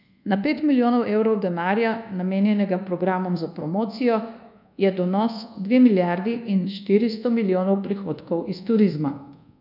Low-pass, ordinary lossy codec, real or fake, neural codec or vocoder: 5.4 kHz; none; fake; codec, 24 kHz, 1.2 kbps, DualCodec